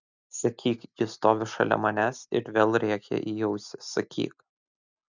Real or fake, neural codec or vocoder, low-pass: real; none; 7.2 kHz